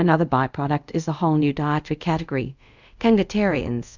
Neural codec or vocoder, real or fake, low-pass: codec, 24 kHz, 0.5 kbps, DualCodec; fake; 7.2 kHz